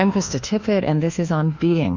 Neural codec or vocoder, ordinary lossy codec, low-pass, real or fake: codec, 24 kHz, 1.2 kbps, DualCodec; Opus, 64 kbps; 7.2 kHz; fake